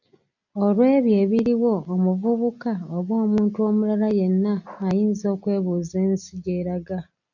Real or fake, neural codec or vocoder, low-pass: real; none; 7.2 kHz